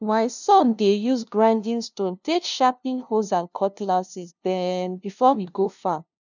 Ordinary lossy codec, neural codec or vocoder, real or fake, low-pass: none; codec, 16 kHz, 0.5 kbps, FunCodec, trained on LibriTTS, 25 frames a second; fake; 7.2 kHz